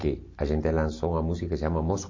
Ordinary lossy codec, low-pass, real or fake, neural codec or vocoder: MP3, 32 kbps; 7.2 kHz; real; none